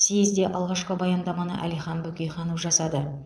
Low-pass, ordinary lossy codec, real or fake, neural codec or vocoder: none; none; fake; vocoder, 22.05 kHz, 80 mel bands, Vocos